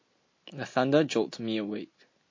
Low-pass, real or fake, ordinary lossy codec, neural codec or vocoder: 7.2 kHz; real; MP3, 32 kbps; none